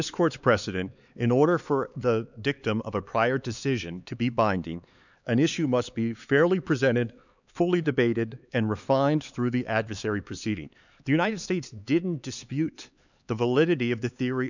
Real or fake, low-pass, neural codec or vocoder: fake; 7.2 kHz; codec, 16 kHz, 4 kbps, X-Codec, HuBERT features, trained on LibriSpeech